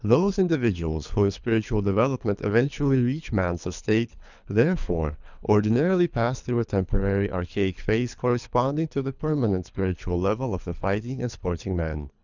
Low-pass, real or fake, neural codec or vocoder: 7.2 kHz; fake; codec, 24 kHz, 3 kbps, HILCodec